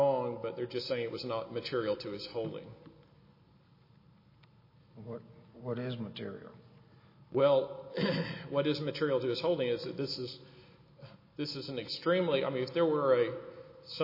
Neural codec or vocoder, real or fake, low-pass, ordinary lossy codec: none; real; 5.4 kHz; MP3, 24 kbps